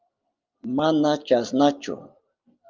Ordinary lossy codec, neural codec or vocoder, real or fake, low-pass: Opus, 24 kbps; none; real; 7.2 kHz